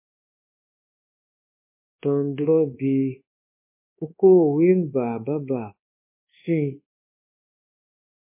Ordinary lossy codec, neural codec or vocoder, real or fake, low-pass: MP3, 24 kbps; codec, 24 kHz, 1.2 kbps, DualCodec; fake; 3.6 kHz